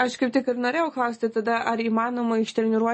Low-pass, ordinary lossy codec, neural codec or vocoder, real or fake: 10.8 kHz; MP3, 32 kbps; none; real